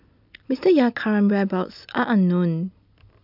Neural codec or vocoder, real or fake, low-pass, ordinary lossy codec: none; real; 5.4 kHz; none